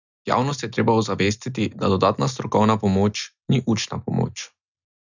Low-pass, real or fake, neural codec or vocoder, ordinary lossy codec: 7.2 kHz; real; none; none